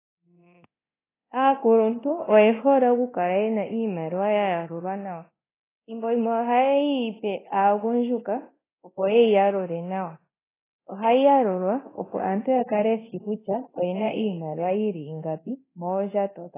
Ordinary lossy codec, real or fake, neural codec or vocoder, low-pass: AAC, 16 kbps; fake; codec, 24 kHz, 0.9 kbps, DualCodec; 3.6 kHz